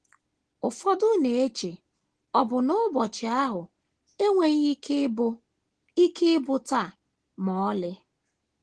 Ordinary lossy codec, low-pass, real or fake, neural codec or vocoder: Opus, 16 kbps; 9.9 kHz; real; none